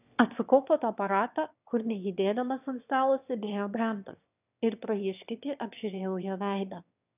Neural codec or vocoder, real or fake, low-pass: autoencoder, 22.05 kHz, a latent of 192 numbers a frame, VITS, trained on one speaker; fake; 3.6 kHz